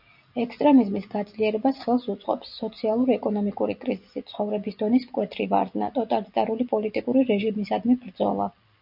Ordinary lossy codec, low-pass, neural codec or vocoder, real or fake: MP3, 48 kbps; 5.4 kHz; none; real